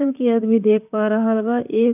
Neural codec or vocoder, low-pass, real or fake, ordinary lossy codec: vocoder, 44.1 kHz, 128 mel bands, Pupu-Vocoder; 3.6 kHz; fake; none